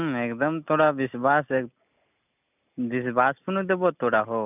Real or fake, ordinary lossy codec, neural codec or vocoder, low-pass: real; none; none; 3.6 kHz